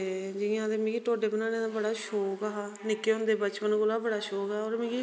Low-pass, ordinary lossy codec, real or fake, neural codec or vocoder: none; none; real; none